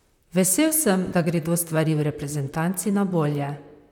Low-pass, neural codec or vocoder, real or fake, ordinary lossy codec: 19.8 kHz; vocoder, 44.1 kHz, 128 mel bands, Pupu-Vocoder; fake; none